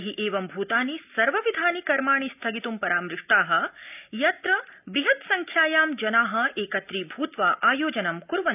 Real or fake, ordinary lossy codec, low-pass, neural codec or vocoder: real; none; 3.6 kHz; none